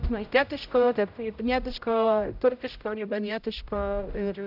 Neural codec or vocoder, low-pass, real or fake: codec, 16 kHz, 0.5 kbps, X-Codec, HuBERT features, trained on general audio; 5.4 kHz; fake